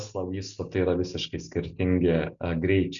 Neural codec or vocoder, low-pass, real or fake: none; 7.2 kHz; real